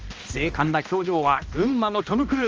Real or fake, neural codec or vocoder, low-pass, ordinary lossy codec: fake; codec, 16 kHz, 1 kbps, X-Codec, HuBERT features, trained on balanced general audio; 7.2 kHz; Opus, 24 kbps